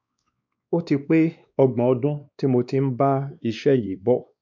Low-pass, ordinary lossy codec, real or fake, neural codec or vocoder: 7.2 kHz; none; fake; codec, 16 kHz, 2 kbps, X-Codec, WavLM features, trained on Multilingual LibriSpeech